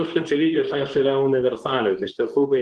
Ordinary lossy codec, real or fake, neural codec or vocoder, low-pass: Opus, 16 kbps; fake; codec, 24 kHz, 0.9 kbps, WavTokenizer, medium speech release version 2; 10.8 kHz